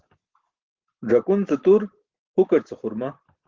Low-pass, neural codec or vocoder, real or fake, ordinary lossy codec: 7.2 kHz; none; real; Opus, 16 kbps